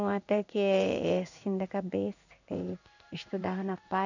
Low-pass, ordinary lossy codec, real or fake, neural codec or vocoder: 7.2 kHz; none; fake; codec, 16 kHz in and 24 kHz out, 1 kbps, XY-Tokenizer